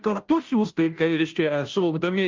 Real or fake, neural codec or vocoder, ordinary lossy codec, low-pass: fake; codec, 16 kHz, 0.5 kbps, FunCodec, trained on Chinese and English, 25 frames a second; Opus, 24 kbps; 7.2 kHz